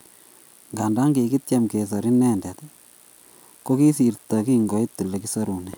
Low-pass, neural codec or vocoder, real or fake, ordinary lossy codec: none; none; real; none